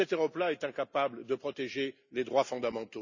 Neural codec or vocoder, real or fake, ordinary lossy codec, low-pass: none; real; none; 7.2 kHz